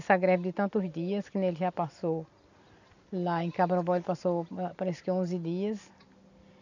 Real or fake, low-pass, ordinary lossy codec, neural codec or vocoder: real; 7.2 kHz; none; none